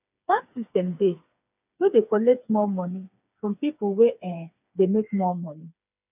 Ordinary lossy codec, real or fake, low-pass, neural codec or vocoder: none; fake; 3.6 kHz; codec, 16 kHz, 4 kbps, FreqCodec, smaller model